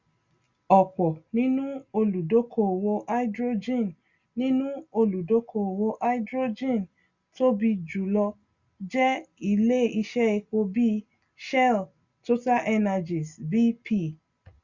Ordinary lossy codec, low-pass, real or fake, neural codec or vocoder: Opus, 64 kbps; 7.2 kHz; real; none